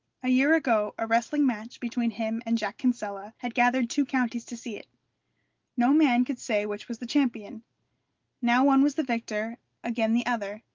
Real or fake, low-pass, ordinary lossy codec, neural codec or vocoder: real; 7.2 kHz; Opus, 32 kbps; none